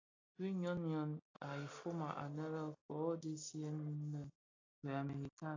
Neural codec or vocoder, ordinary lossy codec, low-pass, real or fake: none; AAC, 32 kbps; 7.2 kHz; real